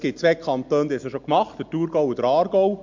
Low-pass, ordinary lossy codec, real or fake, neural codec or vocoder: 7.2 kHz; MP3, 64 kbps; real; none